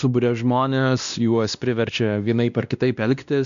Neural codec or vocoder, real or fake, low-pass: codec, 16 kHz, 1 kbps, X-Codec, WavLM features, trained on Multilingual LibriSpeech; fake; 7.2 kHz